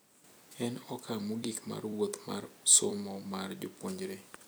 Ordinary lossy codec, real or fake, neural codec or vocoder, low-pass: none; fake; vocoder, 44.1 kHz, 128 mel bands every 256 samples, BigVGAN v2; none